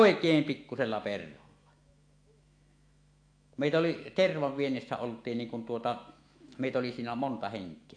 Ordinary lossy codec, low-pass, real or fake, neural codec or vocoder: AAC, 48 kbps; 9.9 kHz; fake; autoencoder, 48 kHz, 128 numbers a frame, DAC-VAE, trained on Japanese speech